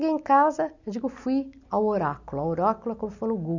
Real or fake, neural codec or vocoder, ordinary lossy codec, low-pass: real; none; none; 7.2 kHz